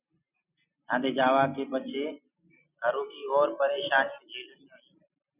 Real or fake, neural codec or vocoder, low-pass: real; none; 3.6 kHz